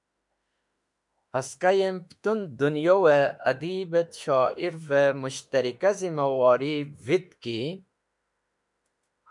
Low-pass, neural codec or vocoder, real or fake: 10.8 kHz; autoencoder, 48 kHz, 32 numbers a frame, DAC-VAE, trained on Japanese speech; fake